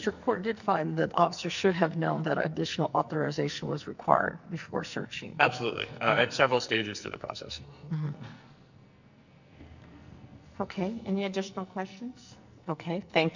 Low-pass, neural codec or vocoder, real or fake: 7.2 kHz; codec, 44.1 kHz, 2.6 kbps, SNAC; fake